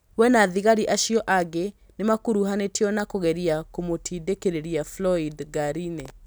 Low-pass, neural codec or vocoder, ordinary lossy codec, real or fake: none; none; none; real